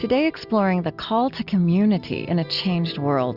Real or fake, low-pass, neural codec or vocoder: real; 5.4 kHz; none